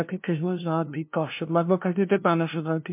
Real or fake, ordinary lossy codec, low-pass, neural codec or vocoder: fake; MP3, 32 kbps; 3.6 kHz; codec, 16 kHz, 0.5 kbps, FunCodec, trained on LibriTTS, 25 frames a second